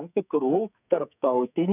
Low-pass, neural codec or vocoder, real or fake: 3.6 kHz; codec, 44.1 kHz, 2.6 kbps, SNAC; fake